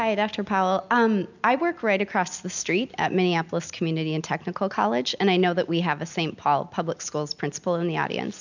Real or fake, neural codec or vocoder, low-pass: real; none; 7.2 kHz